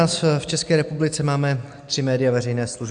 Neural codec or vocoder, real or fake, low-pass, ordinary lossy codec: none; real; 9.9 kHz; AAC, 64 kbps